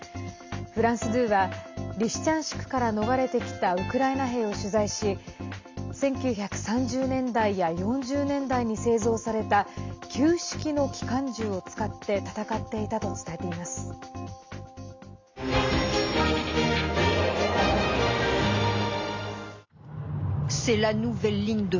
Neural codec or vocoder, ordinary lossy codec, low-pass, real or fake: none; MP3, 64 kbps; 7.2 kHz; real